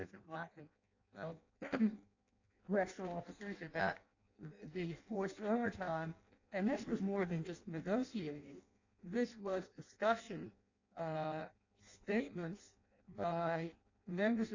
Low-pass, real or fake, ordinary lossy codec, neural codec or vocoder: 7.2 kHz; fake; AAC, 48 kbps; codec, 16 kHz in and 24 kHz out, 0.6 kbps, FireRedTTS-2 codec